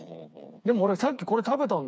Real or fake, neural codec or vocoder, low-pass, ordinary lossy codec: fake; codec, 16 kHz, 4 kbps, FreqCodec, smaller model; none; none